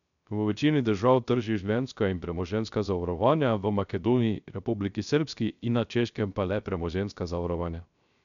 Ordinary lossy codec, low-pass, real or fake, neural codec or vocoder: none; 7.2 kHz; fake; codec, 16 kHz, 0.3 kbps, FocalCodec